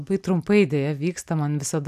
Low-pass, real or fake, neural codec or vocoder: 14.4 kHz; real; none